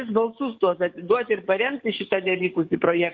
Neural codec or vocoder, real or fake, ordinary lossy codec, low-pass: codec, 44.1 kHz, 7.8 kbps, DAC; fake; Opus, 24 kbps; 7.2 kHz